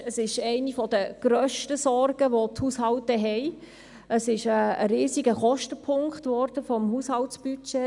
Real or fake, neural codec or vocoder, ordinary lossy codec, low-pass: real; none; none; 10.8 kHz